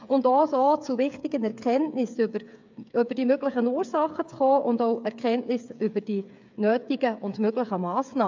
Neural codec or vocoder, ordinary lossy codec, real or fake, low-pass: codec, 16 kHz, 8 kbps, FreqCodec, smaller model; MP3, 64 kbps; fake; 7.2 kHz